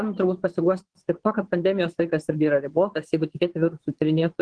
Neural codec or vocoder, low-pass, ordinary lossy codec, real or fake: vocoder, 22.05 kHz, 80 mel bands, Vocos; 9.9 kHz; Opus, 16 kbps; fake